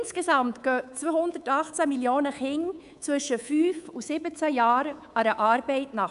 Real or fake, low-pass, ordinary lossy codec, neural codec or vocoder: fake; 10.8 kHz; none; codec, 24 kHz, 3.1 kbps, DualCodec